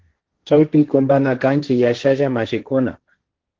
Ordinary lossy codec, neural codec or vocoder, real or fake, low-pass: Opus, 16 kbps; codec, 16 kHz, 1.1 kbps, Voila-Tokenizer; fake; 7.2 kHz